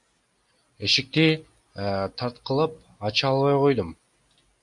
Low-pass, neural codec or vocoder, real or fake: 10.8 kHz; none; real